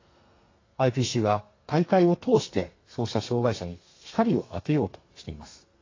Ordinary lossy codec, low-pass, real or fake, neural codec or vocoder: AAC, 32 kbps; 7.2 kHz; fake; codec, 32 kHz, 1.9 kbps, SNAC